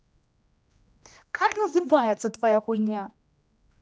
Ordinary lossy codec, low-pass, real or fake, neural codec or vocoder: none; none; fake; codec, 16 kHz, 1 kbps, X-Codec, HuBERT features, trained on general audio